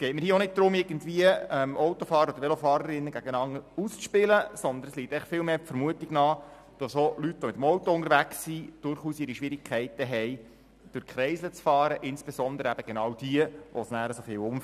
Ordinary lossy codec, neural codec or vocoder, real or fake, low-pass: none; none; real; 14.4 kHz